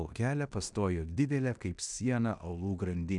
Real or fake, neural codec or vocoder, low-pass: fake; codec, 16 kHz in and 24 kHz out, 0.9 kbps, LongCat-Audio-Codec, fine tuned four codebook decoder; 10.8 kHz